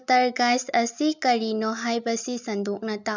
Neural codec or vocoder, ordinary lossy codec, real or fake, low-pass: none; none; real; 7.2 kHz